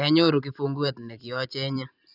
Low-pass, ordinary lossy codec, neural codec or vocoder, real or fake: 5.4 kHz; none; vocoder, 44.1 kHz, 128 mel bands every 256 samples, BigVGAN v2; fake